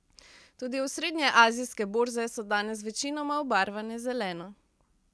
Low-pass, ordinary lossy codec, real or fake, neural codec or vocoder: none; none; real; none